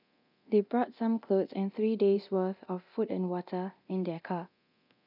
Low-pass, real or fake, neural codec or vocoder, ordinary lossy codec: 5.4 kHz; fake; codec, 24 kHz, 0.9 kbps, DualCodec; none